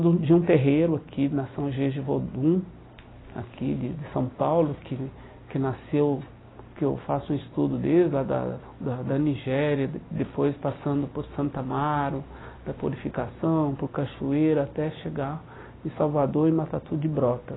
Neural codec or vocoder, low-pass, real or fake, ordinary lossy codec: none; 7.2 kHz; real; AAC, 16 kbps